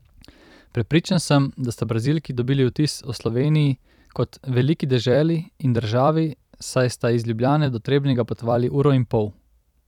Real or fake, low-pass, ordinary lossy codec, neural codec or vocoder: fake; 19.8 kHz; none; vocoder, 44.1 kHz, 128 mel bands every 256 samples, BigVGAN v2